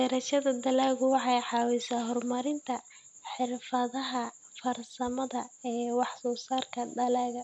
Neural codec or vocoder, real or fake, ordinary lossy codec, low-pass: none; real; none; none